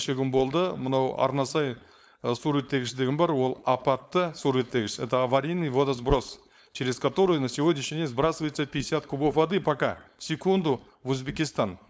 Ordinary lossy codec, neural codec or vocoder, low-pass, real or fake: none; codec, 16 kHz, 4.8 kbps, FACodec; none; fake